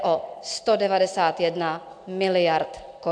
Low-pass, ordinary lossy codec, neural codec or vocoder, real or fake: 9.9 kHz; AAC, 64 kbps; none; real